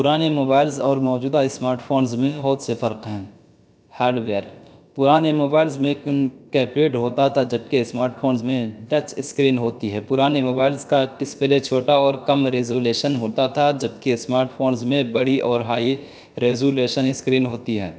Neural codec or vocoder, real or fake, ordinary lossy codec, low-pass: codec, 16 kHz, about 1 kbps, DyCAST, with the encoder's durations; fake; none; none